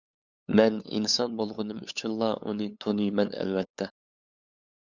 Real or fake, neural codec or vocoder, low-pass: fake; codec, 16 kHz, 8 kbps, FunCodec, trained on LibriTTS, 25 frames a second; 7.2 kHz